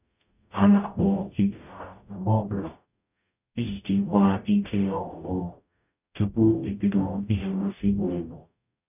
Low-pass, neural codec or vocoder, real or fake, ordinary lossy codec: 3.6 kHz; codec, 44.1 kHz, 0.9 kbps, DAC; fake; none